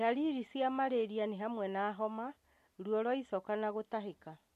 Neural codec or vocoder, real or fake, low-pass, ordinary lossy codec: none; real; 14.4 kHz; MP3, 64 kbps